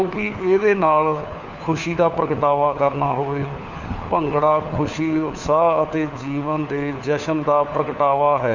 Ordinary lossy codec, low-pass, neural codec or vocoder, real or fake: none; 7.2 kHz; codec, 16 kHz, 4 kbps, FunCodec, trained on LibriTTS, 50 frames a second; fake